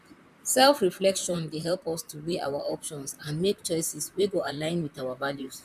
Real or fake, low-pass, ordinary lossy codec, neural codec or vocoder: fake; 14.4 kHz; none; vocoder, 44.1 kHz, 128 mel bands, Pupu-Vocoder